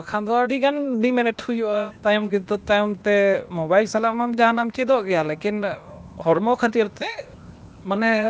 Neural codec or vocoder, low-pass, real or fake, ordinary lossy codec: codec, 16 kHz, 0.8 kbps, ZipCodec; none; fake; none